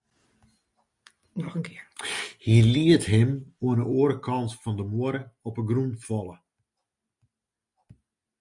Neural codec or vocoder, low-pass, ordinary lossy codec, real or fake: none; 10.8 kHz; MP3, 64 kbps; real